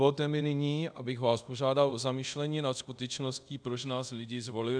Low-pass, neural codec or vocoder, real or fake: 9.9 kHz; codec, 24 kHz, 0.5 kbps, DualCodec; fake